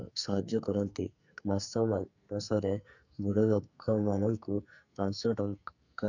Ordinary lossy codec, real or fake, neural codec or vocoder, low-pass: none; fake; codec, 44.1 kHz, 2.6 kbps, SNAC; 7.2 kHz